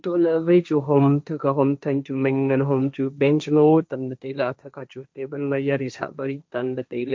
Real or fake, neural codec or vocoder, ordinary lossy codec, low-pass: fake; codec, 16 kHz, 1.1 kbps, Voila-Tokenizer; none; none